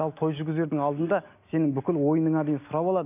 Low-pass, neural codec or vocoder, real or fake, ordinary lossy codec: 3.6 kHz; none; real; none